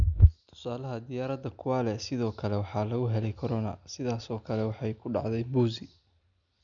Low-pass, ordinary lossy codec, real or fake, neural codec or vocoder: 7.2 kHz; none; real; none